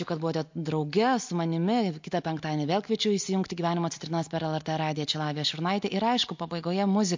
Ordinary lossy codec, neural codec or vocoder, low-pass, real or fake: MP3, 48 kbps; none; 7.2 kHz; real